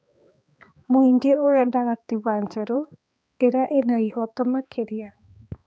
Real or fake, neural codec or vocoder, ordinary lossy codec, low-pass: fake; codec, 16 kHz, 2 kbps, X-Codec, HuBERT features, trained on balanced general audio; none; none